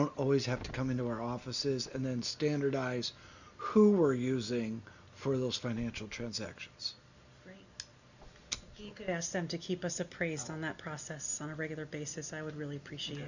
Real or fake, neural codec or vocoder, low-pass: real; none; 7.2 kHz